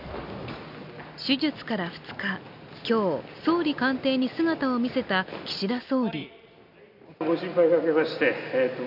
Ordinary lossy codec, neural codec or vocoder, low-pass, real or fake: none; none; 5.4 kHz; real